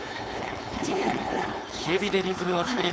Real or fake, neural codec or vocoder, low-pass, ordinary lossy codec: fake; codec, 16 kHz, 4.8 kbps, FACodec; none; none